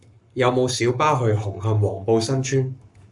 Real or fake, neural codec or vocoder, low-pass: fake; codec, 44.1 kHz, 7.8 kbps, Pupu-Codec; 10.8 kHz